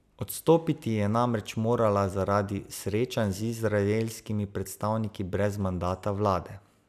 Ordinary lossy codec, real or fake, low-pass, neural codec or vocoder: none; real; 14.4 kHz; none